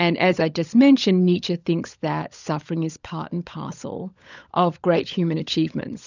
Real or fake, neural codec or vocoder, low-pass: fake; codec, 16 kHz, 16 kbps, FunCodec, trained on LibriTTS, 50 frames a second; 7.2 kHz